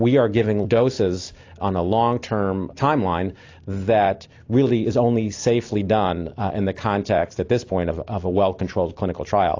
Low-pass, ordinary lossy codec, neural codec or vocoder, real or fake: 7.2 kHz; AAC, 48 kbps; none; real